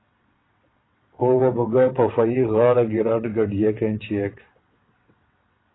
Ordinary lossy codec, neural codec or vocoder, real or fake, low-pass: AAC, 16 kbps; vocoder, 22.05 kHz, 80 mel bands, WaveNeXt; fake; 7.2 kHz